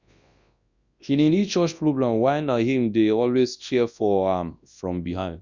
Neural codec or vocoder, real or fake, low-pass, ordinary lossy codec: codec, 24 kHz, 0.9 kbps, WavTokenizer, large speech release; fake; 7.2 kHz; Opus, 64 kbps